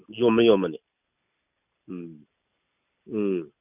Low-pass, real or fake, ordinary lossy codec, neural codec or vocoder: 3.6 kHz; real; none; none